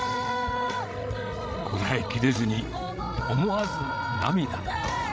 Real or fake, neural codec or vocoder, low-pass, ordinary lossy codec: fake; codec, 16 kHz, 16 kbps, FreqCodec, larger model; none; none